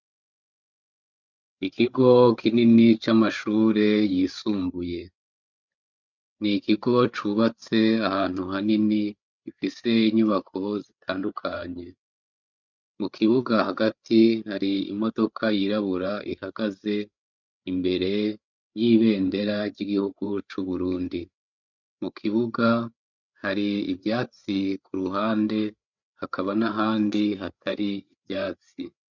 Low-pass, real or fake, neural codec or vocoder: 7.2 kHz; fake; codec, 16 kHz, 8 kbps, FreqCodec, larger model